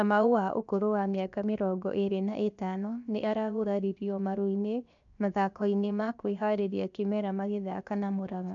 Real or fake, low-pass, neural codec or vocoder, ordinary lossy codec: fake; 7.2 kHz; codec, 16 kHz, 0.7 kbps, FocalCodec; none